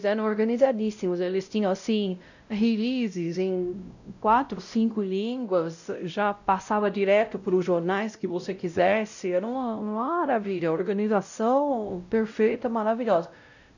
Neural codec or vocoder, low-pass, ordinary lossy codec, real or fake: codec, 16 kHz, 0.5 kbps, X-Codec, WavLM features, trained on Multilingual LibriSpeech; 7.2 kHz; none; fake